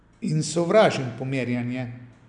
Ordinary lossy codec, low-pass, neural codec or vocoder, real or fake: none; 9.9 kHz; none; real